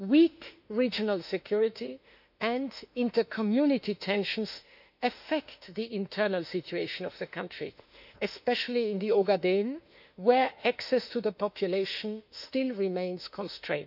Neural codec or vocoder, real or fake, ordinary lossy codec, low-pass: autoencoder, 48 kHz, 32 numbers a frame, DAC-VAE, trained on Japanese speech; fake; none; 5.4 kHz